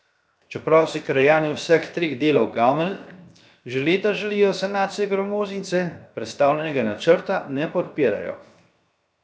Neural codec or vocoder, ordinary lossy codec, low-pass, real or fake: codec, 16 kHz, 0.7 kbps, FocalCodec; none; none; fake